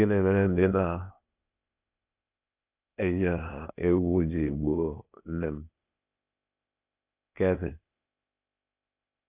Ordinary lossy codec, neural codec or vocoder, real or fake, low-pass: none; codec, 16 kHz, 0.8 kbps, ZipCodec; fake; 3.6 kHz